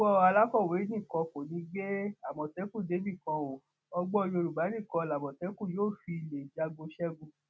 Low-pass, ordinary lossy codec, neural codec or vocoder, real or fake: none; none; none; real